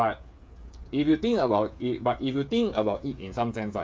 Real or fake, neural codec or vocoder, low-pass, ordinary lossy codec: fake; codec, 16 kHz, 8 kbps, FreqCodec, smaller model; none; none